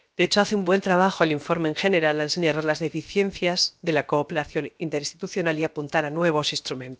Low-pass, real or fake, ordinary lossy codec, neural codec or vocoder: none; fake; none; codec, 16 kHz, 0.7 kbps, FocalCodec